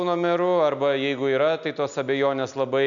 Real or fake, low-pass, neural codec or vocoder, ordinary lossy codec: real; 7.2 kHz; none; MP3, 96 kbps